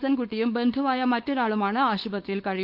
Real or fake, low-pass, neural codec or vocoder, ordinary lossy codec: fake; 5.4 kHz; codec, 16 kHz, 4.8 kbps, FACodec; Opus, 32 kbps